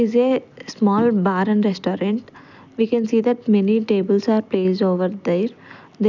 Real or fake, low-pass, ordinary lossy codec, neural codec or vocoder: real; 7.2 kHz; none; none